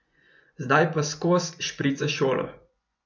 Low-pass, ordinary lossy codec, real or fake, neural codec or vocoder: 7.2 kHz; none; fake; vocoder, 24 kHz, 100 mel bands, Vocos